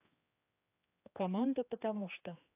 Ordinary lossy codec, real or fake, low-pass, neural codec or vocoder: AAC, 24 kbps; fake; 3.6 kHz; codec, 16 kHz, 2 kbps, X-Codec, HuBERT features, trained on general audio